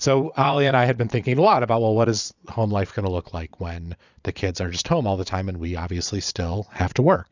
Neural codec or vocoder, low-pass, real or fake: vocoder, 22.05 kHz, 80 mel bands, Vocos; 7.2 kHz; fake